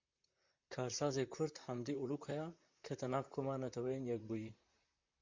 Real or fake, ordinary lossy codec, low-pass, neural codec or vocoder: fake; MP3, 64 kbps; 7.2 kHz; vocoder, 44.1 kHz, 128 mel bands, Pupu-Vocoder